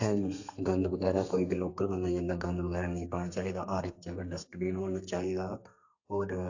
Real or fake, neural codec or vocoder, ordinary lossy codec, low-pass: fake; codec, 32 kHz, 1.9 kbps, SNAC; AAC, 48 kbps; 7.2 kHz